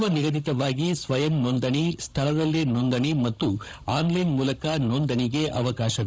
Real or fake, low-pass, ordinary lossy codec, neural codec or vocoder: fake; none; none; codec, 16 kHz, 16 kbps, FunCodec, trained on Chinese and English, 50 frames a second